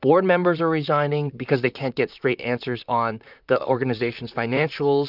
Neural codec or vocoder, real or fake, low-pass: vocoder, 44.1 kHz, 128 mel bands, Pupu-Vocoder; fake; 5.4 kHz